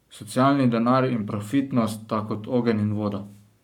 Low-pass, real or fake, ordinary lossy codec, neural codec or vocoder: 19.8 kHz; fake; none; codec, 44.1 kHz, 7.8 kbps, Pupu-Codec